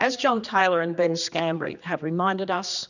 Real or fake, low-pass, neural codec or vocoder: fake; 7.2 kHz; codec, 16 kHz, 2 kbps, X-Codec, HuBERT features, trained on general audio